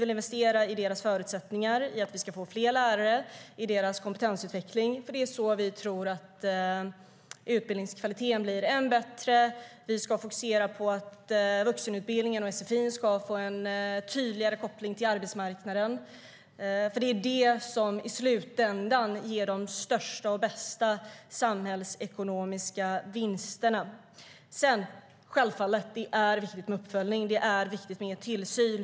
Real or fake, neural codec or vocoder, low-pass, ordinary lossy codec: real; none; none; none